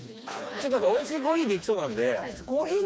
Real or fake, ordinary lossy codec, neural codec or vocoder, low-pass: fake; none; codec, 16 kHz, 2 kbps, FreqCodec, smaller model; none